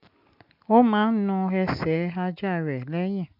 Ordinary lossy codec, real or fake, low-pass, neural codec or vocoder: AAC, 48 kbps; real; 5.4 kHz; none